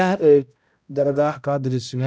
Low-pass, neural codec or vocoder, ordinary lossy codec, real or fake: none; codec, 16 kHz, 0.5 kbps, X-Codec, HuBERT features, trained on balanced general audio; none; fake